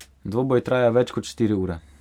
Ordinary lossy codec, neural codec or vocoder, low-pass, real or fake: none; none; 19.8 kHz; real